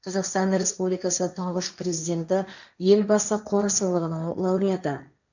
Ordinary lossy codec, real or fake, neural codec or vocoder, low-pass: none; fake; codec, 16 kHz, 1.1 kbps, Voila-Tokenizer; 7.2 kHz